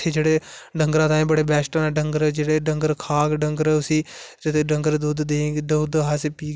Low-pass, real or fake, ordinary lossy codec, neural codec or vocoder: none; real; none; none